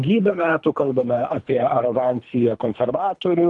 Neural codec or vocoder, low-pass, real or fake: codec, 24 kHz, 3 kbps, HILCodec; 10.8 kHz; fake